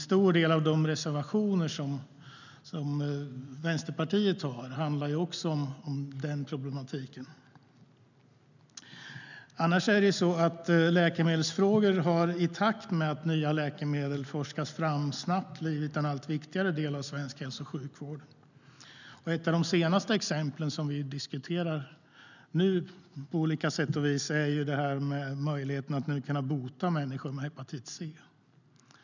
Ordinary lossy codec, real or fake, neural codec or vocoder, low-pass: none; real; none; 7.2 kHz